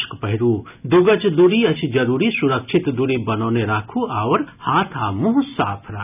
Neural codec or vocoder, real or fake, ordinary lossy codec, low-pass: none; real; none; 3.6 kHz